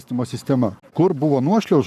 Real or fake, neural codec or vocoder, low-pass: fake; codec, 44.1 kHz, 7.8 kbps, Pupu-Codec; 14.4 kHz